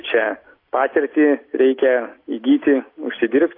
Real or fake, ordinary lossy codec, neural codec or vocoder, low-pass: real; AAC, 32 kbps; none; 5.4 kHz